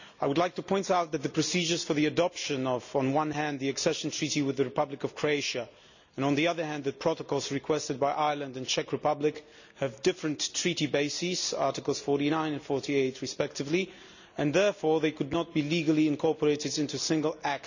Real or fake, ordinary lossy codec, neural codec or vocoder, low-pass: real; none; none; 7.2 kHz